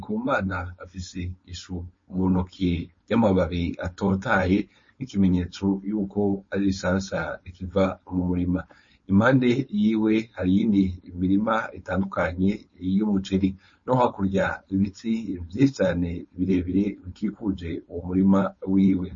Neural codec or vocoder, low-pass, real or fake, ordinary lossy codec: codec, 16 kHz, 4.8 kbps, FACodec; 7.2 kHz; fake; MP3, 32 kbps